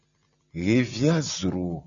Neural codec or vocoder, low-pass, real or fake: none; 7.2 kHz; real